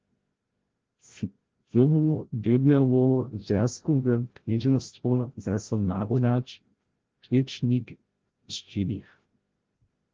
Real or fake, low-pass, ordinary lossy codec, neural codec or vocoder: fake; 7.2 kHz; Opus, 16 kbps; codec, 16 kHz, 0.5 kbps, FreqCodec, larger model